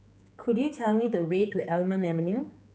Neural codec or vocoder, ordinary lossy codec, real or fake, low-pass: codec, 16 kHz, 4 kbps, X-Codec, HuBERT features, trained on general audio; none; fake; none